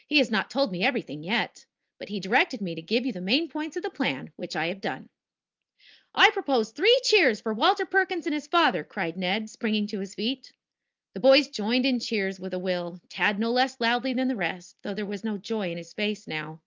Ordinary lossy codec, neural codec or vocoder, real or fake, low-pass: Opus, 24 kbps; none; real; 7.2 kHz